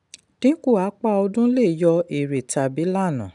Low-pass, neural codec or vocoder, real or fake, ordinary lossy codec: 10.8 kHz; none; real; none